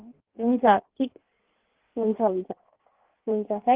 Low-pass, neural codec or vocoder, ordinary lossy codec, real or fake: 3.6 kHz; codec, 16 kHz in and 24 kHz out, 1.1 kbps, FireRedTTS-2 codec; Opus, 16 kbps; fake